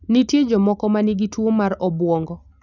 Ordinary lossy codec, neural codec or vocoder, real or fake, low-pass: none; none; real; 7.2 kHz